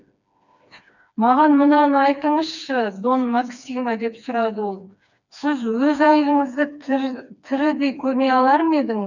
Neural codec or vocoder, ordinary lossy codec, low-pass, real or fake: codec, 16 kHz, 2 kbps, FreqCodec, smaller model; none; 7.2 kHz; fake